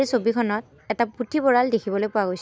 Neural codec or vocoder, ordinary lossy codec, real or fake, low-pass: none; none; real; none